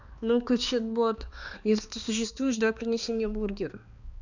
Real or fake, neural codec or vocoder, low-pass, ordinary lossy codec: fake; codec, 16 kHz, 2 kbps, X-Codec, HuBERT features, trained on balanced general audio; 7.2 kHz; none